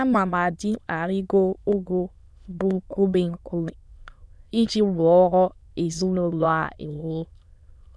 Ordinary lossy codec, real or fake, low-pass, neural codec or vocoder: none; fake; none; autoencoder, 22.05 kHz, a latent of 192 numbers a frame, VITS, trained on many speakers